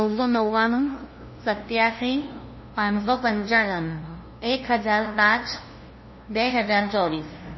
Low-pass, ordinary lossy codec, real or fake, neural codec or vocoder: 7.2 kHz; MP3, 24 kbps; fake; codec, 16 kHz, 0.5 kbps, FunCodec, trained on LibriTTS, 25 frames a second